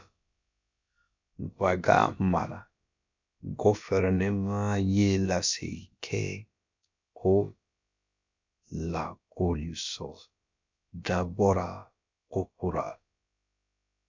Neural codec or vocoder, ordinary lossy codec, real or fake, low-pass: codec, 16 kHz, about 1 kbps, DyCAST, with the encoder's durations; MP3, 64 kbps; fake; 7.2 kHz